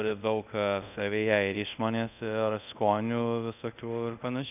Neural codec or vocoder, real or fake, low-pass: codec, 24 kHz, 0.5 kbps, DualCodec; fake; 3.6 kHz